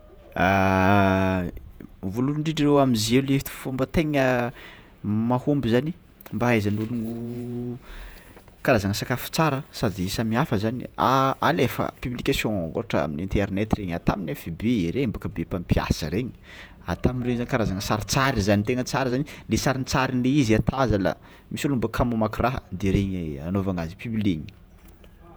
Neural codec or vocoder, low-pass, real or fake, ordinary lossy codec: vocoder, 48 kHz, 128 mel bands, Vocos; none; fake; none